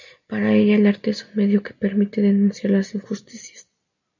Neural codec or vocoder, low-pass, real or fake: none; 7.2 kHz; real